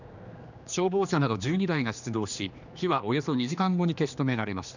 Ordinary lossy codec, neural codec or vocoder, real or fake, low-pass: none; codec, 16 kHz, 2 kbps, X-Codec, HuBERT features, trained on general audio; fake; 7.2 kHz